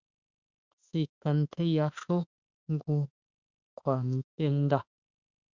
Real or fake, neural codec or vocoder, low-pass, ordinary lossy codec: fake; autoencoder, 48 kHz, 32 numbers a frame, DAC-VAE, trained on Japanese speech; 7.2 kHz; Opus, 64 kbps